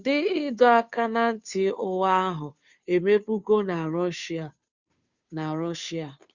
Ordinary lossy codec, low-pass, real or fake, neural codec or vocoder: Opus, 64 kbps; 7.2 kHz; fake; codec, 16 kHz, 2 kbps, FunCodec, trained on Chinese and English, 25 frames a second